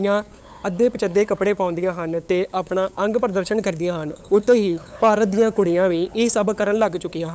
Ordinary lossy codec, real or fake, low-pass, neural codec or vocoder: none; fake; none; codec, 16 kHz, 8 kbps, FunCodec, trained on LibriTTS, 25 frames a second